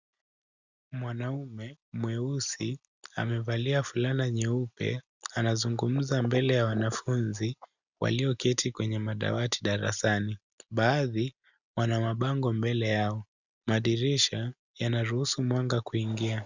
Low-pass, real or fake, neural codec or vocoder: 7.2 kHz; real; none